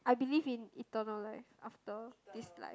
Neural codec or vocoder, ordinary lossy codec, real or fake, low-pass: none; none; real; none